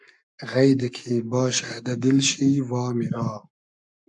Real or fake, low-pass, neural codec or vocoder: fake; 10.8 kHz; codec, 44.1 kHz, 7.8 kbps, Pupu-Codec